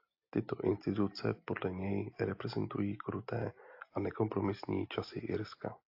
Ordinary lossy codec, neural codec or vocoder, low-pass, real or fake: AAC, 32 kbps; none; 5.4 kHz; real